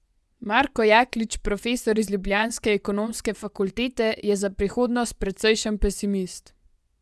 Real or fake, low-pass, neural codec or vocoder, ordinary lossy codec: fake; none; vocoder, 24 kHz, 100 mel bands, Vocos; none